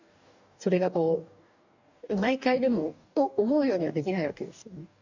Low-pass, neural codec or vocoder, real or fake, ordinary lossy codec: 7.2 kHz; codec, 44.1 kHz, 2.6 kbps, DAC; fake; none